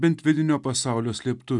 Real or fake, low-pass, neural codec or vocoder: real; 10.8 kHz; none